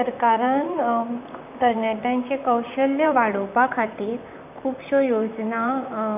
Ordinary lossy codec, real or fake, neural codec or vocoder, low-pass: none; fake; vocoder, 44.1 kHz, 128 mel bands, Pupu-Vocoder; 3.6 kHz